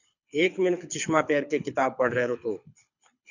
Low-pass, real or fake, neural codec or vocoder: 7.2 kHz; fake; codec, 24 kHz, 6 kbps, HILCodec